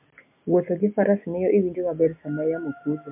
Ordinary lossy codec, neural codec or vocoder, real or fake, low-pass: MP3, 32 kbps; none; real; 3.6 kHz